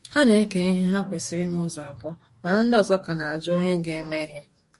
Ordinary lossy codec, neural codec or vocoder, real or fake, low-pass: MP3, 48 kbps; codec, 44.1 kHz, 2.6 kbps, DAC; fake; 14.4 kHz